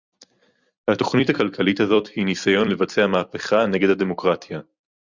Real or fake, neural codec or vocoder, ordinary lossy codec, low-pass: fake; vocoder, 44.1 kHz, 128 mel bands every 256 samples, BigVGAN v2; Opus, 64 kbps; 7.2 kHz